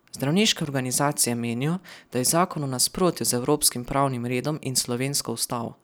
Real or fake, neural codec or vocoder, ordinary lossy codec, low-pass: real; none; none; none